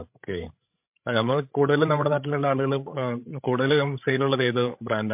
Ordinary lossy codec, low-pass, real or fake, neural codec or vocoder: MP3, 32 kbps; 3.6 kHz; fake; codec, 16 kHz, 16 kbps, FreqCodec, larger model